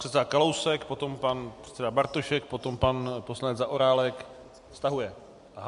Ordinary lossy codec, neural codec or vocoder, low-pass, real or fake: MP3, 64 kbps; none; 10.8 kHz; real